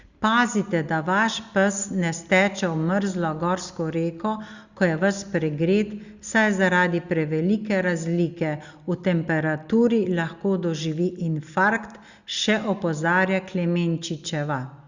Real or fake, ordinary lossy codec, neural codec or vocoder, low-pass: real; Opus, 64 kbps; none; 7.2 kHz